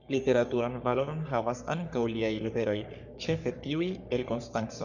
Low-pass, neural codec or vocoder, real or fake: 7.2 kHz; codec, 44.1 kHz, 3.4 kbps, Pupu-Codec; fake